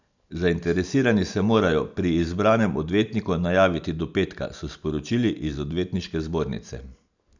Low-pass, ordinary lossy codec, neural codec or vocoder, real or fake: 7.2 kHz; none; none; real